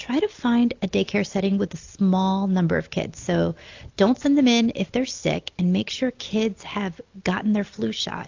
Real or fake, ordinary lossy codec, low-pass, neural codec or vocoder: real; AAC, 48 kbps; 7.2 kHz; none